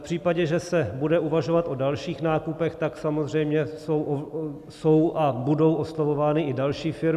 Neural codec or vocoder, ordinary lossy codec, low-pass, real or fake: none; AAC, 96 kbps; 14.4 kHz; real